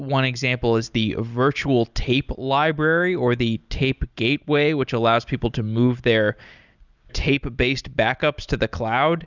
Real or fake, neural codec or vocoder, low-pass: real; none; 7.2 kHz